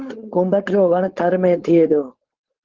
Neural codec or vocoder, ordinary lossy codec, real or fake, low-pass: codec, 16 kHz, 4.8 kbps, FACodec; Opus, 16 kbps; fake; 7.2 kHz